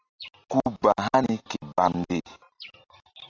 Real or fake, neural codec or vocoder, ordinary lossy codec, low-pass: real; none; AAC, 32 kbps; 7.2 kHz